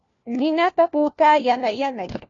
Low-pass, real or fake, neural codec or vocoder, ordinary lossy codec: 7.2 kHz; fake; codec, 16 kHz, 1 kbps, FunCodec, trained on LibriTTS, 50 frames a second; AAC, 32 kbps